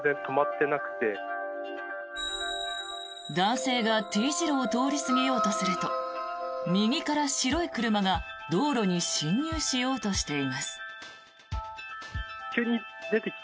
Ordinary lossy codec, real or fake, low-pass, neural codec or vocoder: none; real; none; none